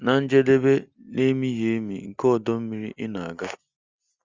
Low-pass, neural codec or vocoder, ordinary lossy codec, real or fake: 7.2 kHz; none; Opus, 32 kbps; real